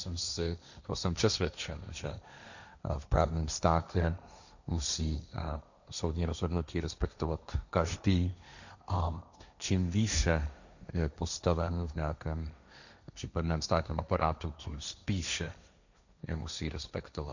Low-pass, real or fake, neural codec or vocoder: 7.2 kHz; fake; codec, 16 kHz, 1.1 kbps, Voila-Tokenizer